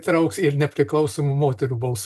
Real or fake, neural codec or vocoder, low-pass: real; none; 14.4 kHz